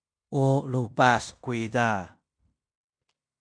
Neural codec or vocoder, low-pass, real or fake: codec, 16 kHz in and 24 kHz out, 0.9 kbps, LongCat-Audio-Codec, fine tuned four codebook decoder; 9.9 kHz; fake